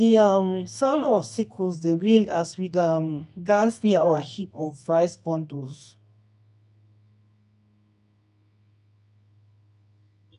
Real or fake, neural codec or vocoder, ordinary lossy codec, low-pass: fake; codec, 24 kHz, 0.9 kbps, WavTokenizer, medium music audio release; none; 10.8 kHz